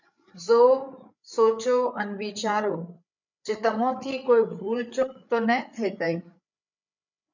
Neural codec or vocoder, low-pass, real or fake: codec, 16 kHz, 8 kbps, FreqCodec, larger model; 7.2 kHz; fake